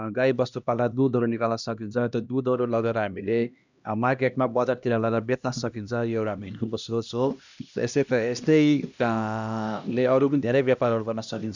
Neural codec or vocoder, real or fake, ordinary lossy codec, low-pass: codec, 16 kHz, 1 kbps, X-Codec, HuBERT features, trained on LibriSpeech; fake; none; 7.2 kHz